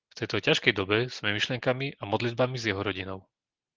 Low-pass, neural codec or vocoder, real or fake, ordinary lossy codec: 7.2 kHz; none; real; Opus, 16 kbps